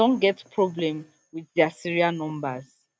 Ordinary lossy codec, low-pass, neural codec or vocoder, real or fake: none; none; none; real